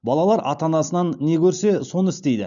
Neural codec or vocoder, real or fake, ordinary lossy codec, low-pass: none; real; none; 7.2 kHz